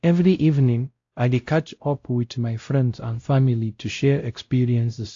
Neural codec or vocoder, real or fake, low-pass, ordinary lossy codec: codec, 16 kHz, 0.5 kbps, X-Codec, WavLM features, trained on Multilingual LibriSpeech; fake; 7.2 kHz; AAC, 48 kbps